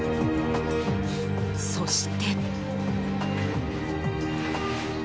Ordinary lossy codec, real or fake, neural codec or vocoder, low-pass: none; real; none; none